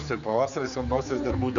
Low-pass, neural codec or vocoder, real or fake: 7.2 kHz; codec, 16 kHz, 4 kbps, X-Codec, HuBERT features, trained on general audio; fake